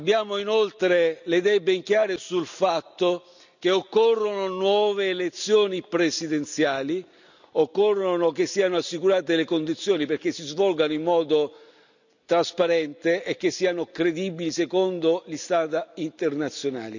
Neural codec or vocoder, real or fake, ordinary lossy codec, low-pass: none; real; none; 7.2 kHz